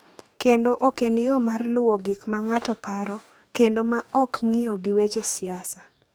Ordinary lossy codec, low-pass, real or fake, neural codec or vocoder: none; none; fake; codec, 44.1 kHz, 2.6 kbps, DAC